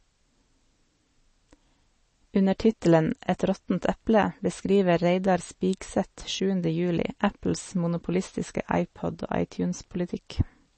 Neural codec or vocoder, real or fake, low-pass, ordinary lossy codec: none; real; 10.8 kHz; MP3, 32 kbps